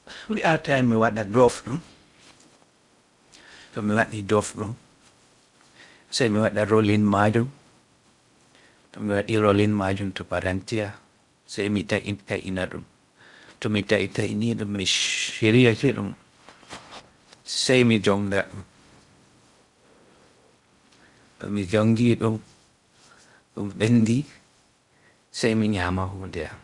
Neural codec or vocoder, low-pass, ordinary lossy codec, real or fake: codec, 16 kHz in and 24 kHz out, 0.6 kbps, FocalCodec, streaming, 2048 codes; 10.8 kHz; Opus, 64 kbps; fake